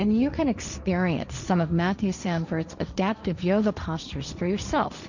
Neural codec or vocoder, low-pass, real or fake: codec, 16 kHz, 1.1 kbps, Voila-Tokenizer; 7.2 kHz; fake